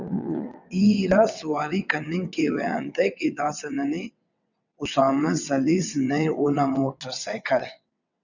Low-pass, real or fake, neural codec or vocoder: 7.2 kHz; fake; vocoder, 22.05 kHz, 80 mel bands, WaveNeXt